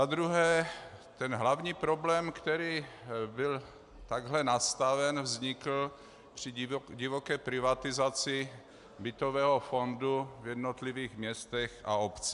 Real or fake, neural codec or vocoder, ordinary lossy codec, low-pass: real; none; AAC, 96 kbps; 10.8 kHz